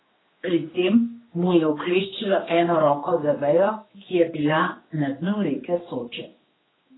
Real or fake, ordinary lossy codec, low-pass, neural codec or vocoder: fake; AAC, 16 kbps; 7.2 kHz; codec, 16 kHz, 2 kbps, X-Codec, HuBERT features, trained on general audio